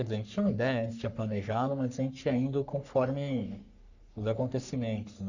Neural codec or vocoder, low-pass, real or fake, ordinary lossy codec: codec, 44.1 kHz, 3.4 kbps, Pupu-Codec; 7.2 kHz; fake; none